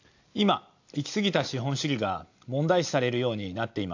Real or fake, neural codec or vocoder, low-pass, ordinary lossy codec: real; none; 7.2 kHz; AAC, 48 kbps